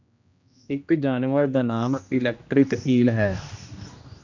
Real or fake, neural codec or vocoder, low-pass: fake; codec, 16 kHz, 1 kbps, X-Codec, HuBERT features, trained on general audio; 7.2 kHz